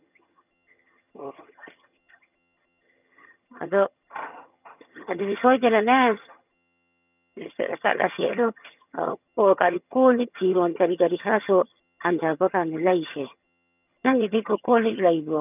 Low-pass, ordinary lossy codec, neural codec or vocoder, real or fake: 3.6 kHz; none; vocoder, 22.05 kHz, 80 mel bands, HiFi-GAN; fake